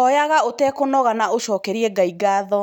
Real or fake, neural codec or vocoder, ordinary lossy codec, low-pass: real; none; none; 19.8 kHz